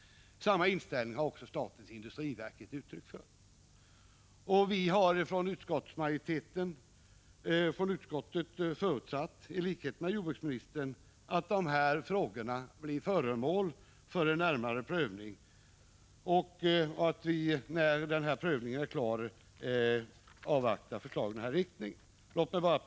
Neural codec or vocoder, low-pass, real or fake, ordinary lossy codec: none; none; real; none